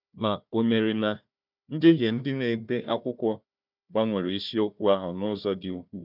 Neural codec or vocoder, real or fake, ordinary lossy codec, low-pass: codec, 16 kHz, 1 kbps, FunCodec, trained on Chinese and English, 50 frames a second; fake; none; 5.4 kHz